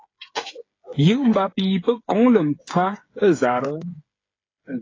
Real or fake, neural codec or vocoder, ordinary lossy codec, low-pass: fake; codec, 16 kHz, 8 kbps, FreqCodec, smaller model; AAC, 32 kbps; 7.2 kHz